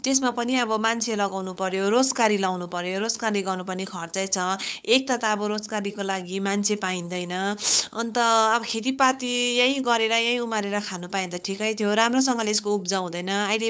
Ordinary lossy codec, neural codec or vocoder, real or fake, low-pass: none; codec, 16 kHz, 8 kbps, FunCodec, trained on LibriTTS, 25 frames a second; fake; none